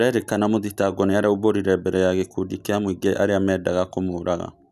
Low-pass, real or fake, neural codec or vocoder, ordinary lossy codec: 14.4 kHz; real; none; none